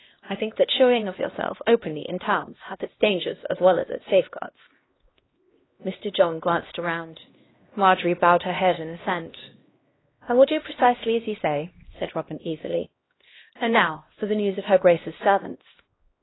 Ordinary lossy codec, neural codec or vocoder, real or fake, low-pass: AAC, 16 kbps; codec, 16 kHz, 1 kbps, X-Codec, HuBERT features, trained on LibriSpeech; fake; 7.2 kHz